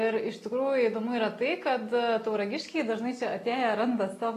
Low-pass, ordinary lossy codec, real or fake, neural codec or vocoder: 14.4 kHz; AAC, 48 kbps; real; none